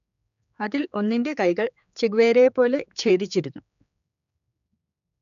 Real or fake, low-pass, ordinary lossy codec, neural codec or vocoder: fake; 7.2 kHz; none; codec, 16 kHz, 4 kbps, X-Codec, HuBERT features, trained on general audio